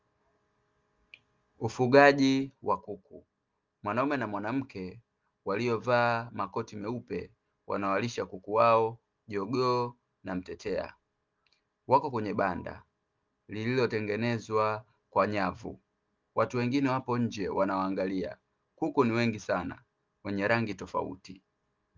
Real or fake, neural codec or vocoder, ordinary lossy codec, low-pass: real; none; Opus, 32 kbps; 7.2 kHz